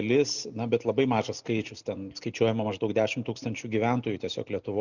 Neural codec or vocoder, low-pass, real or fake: none; 7.2 kHz; real